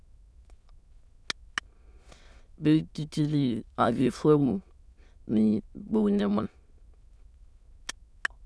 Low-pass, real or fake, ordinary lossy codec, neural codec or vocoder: none; fake; none; autoencoder, 22.05 kHz, a latent of 192 numbers a frame, VITS, trained on many speakers